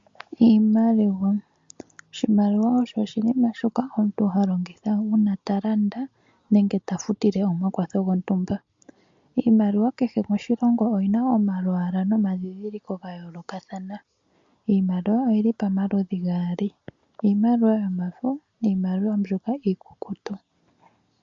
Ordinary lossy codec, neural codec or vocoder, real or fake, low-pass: MP3, 48 kbps; none; real; 7.2 kHz